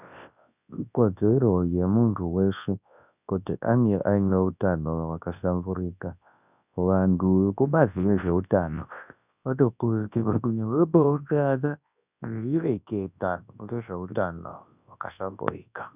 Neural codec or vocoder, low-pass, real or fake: codec, 24 kHz, 0.9 kbps, WavTokenizer, large speech release; 3.6 kHz; fake